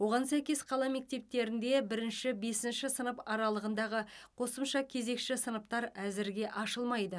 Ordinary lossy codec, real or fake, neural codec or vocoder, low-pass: none; real; none; none